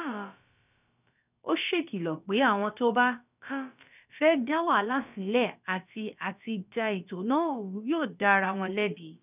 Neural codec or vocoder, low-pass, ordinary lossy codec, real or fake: codec, 16 kHz, about 1 kbps, DyCAST, with the encoder's durations; 3.6 kHz; none; fake